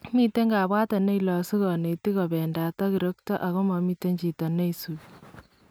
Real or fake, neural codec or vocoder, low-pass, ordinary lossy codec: real; none; none; none